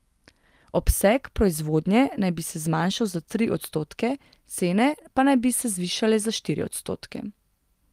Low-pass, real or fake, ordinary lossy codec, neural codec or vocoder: 14.4 kHz; real; Opus, 32 kbps; none